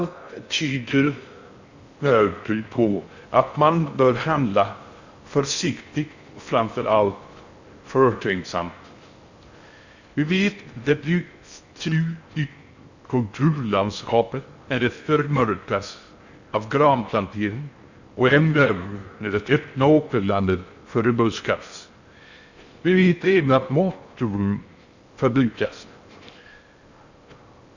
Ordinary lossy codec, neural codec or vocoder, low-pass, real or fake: Opus, 64 kbps; codec, 16 kHz in and 24 kHz out, 0.6 kbps, FocalCodec, streaming, 4096 codes; 7.2 kHz; fake